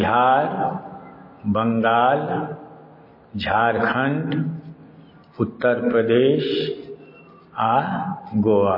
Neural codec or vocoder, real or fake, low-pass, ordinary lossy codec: none; real; 5.4 kHz; MP3, 24 kbps